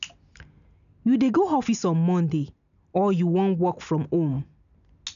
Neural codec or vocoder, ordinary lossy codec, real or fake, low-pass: none; none; real; 7.2 kHz